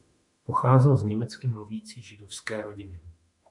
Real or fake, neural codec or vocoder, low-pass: fake; autoencoder, 48 kHz, 32 numbers a frame, DAC-VAE, trained on Japanese speech; 10.8 kHz